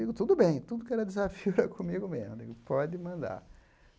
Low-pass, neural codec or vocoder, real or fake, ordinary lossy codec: none; none; real; none